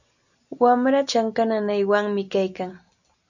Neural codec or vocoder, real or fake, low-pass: none; real; 7.2 kHz